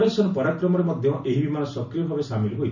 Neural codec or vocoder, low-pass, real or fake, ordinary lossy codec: none; 7.2 kHz; real; none